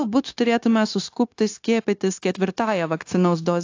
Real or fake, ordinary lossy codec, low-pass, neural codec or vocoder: fake; AAC, 48 kbps; 7.2 kHz; codec, 16 kHz, 0.9 kbps, LongCat-Audio-Codec